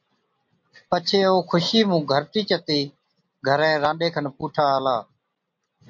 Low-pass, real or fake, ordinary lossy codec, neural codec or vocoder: 7.2 kHz; real; MP3, 48 kbps; none